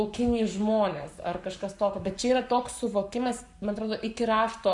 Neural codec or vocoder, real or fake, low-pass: codec, 44.1 kHz, 7.8 kbps, Pupu-Codec; fake; 10.8 kHz